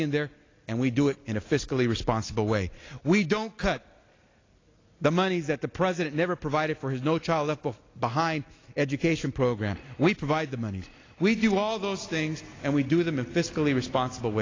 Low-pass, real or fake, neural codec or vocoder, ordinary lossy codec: 7.2 kHz; real; none; AAC, 32 kbps